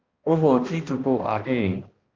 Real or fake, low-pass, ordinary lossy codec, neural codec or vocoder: fake; 7.2 kHz; Opus, 24 kbps; codec, 16 kHz, 1 kbps, X-Codec, HuBERT features, trained on balanced general audio